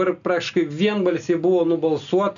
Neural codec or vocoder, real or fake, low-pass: none; real; 7.2 kHz